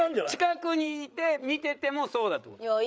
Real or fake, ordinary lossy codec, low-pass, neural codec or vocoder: fake; none; none; codec, 16 kHz, 8 kbps, FreqCodec, larger model